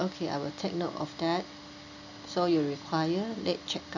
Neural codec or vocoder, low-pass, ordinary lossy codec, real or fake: none; 7.2 kHz; none; real